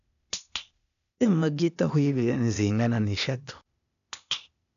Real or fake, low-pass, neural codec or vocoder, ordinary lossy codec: fake; 7.2 kHz; codec, 16 kHz, 0.8 kbps, ZipCodec; MP3, 96 kbps